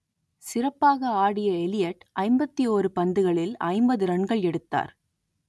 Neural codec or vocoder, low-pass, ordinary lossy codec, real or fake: none; none; none; real